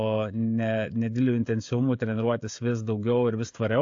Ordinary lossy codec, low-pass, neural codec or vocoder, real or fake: AAC, 48 kbps; 7.2 kHz; codec, 16 kHz, 16 kbps, FreqCodec, smaller model; fake